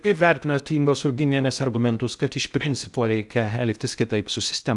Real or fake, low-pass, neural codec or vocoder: fake; 10.8 kHz; codec, 16 kHz in and 24 kHz out, 0.6 kbps, FocalCodec, streaming, 2048 codes